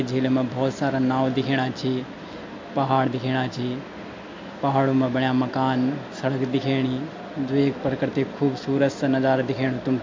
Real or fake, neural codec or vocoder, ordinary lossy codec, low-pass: real; none; MP3, 48 kbps; 7.2 kHz